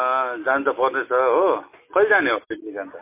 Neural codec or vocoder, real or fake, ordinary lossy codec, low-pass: none; real; MP3, 24 kbps; 3.6 kHz